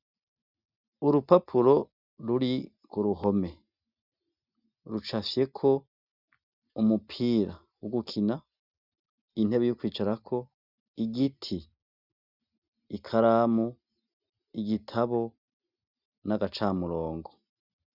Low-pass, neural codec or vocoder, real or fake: 5.4 kHz; none; real